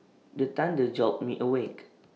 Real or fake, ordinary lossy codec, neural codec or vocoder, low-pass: real; none; none; none